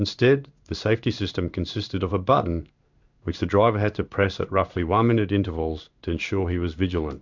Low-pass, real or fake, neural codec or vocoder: 7.2 kHz; fake; codec, 16 kHz in and 24 kHz out, 1 kbps, XY-Tokenizer